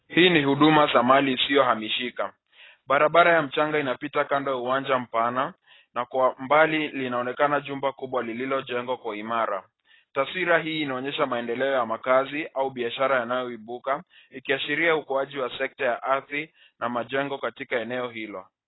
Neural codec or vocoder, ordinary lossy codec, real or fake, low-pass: none; AAC, 16 kbps; real; 7.2 kHz